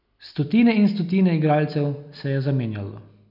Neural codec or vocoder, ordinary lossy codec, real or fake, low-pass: none; none; real; 5.4 kHz